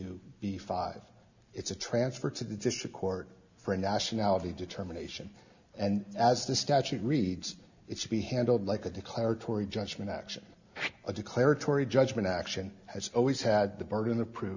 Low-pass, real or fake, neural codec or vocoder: 7.2 kHz; real; none